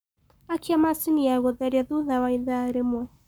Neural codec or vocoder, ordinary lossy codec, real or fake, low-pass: codec, 44.1 kHz, 7.8 kbps, Pupu-Codec; none; fake; none